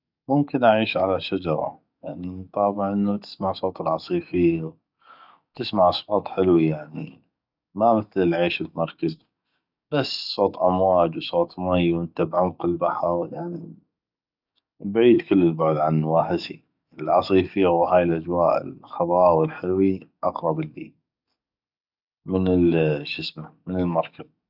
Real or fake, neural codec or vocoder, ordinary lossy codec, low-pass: fake; codec, 44.1 kHz, 7.8 kbps, DAC; none; 5.4 kHz